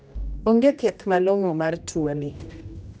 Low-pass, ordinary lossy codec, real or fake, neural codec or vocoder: none; none; fake; codec, 16 kHz, 1 kbps, X-Codec, HuBERT features, trained on general audio